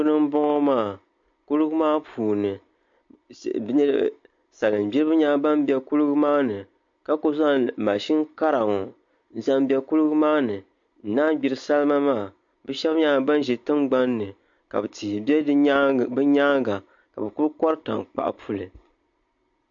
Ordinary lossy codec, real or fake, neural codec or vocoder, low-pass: MP3, 48 kbps; real; none; 7.2 kHz